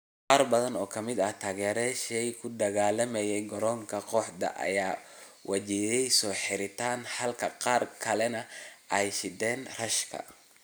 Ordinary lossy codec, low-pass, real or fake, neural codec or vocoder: none; none; real; none